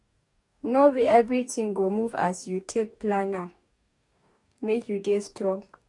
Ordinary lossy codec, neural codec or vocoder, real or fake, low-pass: none; codec, 44.1 kHz, 2.6 kbps, DAC; fake; 10.8 kHz